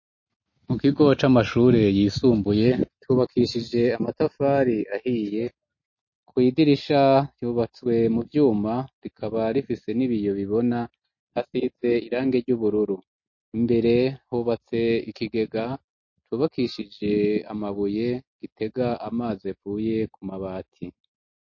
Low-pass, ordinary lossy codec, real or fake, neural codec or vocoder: 7.2 kHz; MP3, 32 kbps; real; none